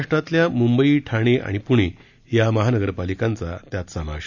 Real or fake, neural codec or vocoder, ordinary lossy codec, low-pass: real; none; none; 7.2 kHz